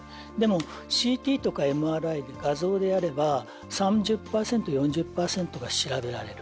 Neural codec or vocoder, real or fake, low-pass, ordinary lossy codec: none; real; none; none